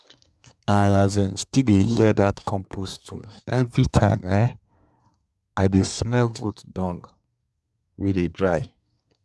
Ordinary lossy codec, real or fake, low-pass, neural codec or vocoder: none; fake; none; codec, 24 kHz, 1 kbps, SNAC